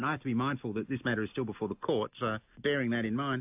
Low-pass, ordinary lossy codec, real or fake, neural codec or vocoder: 3.6 kHz; AAC, 32 kbps; real; none